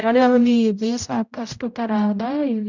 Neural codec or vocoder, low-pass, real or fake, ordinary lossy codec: codec, 16 kHz, 0.5 kbps, X-Codec, HuBERT features, trained on general audio; 7.2 kHz; fake; none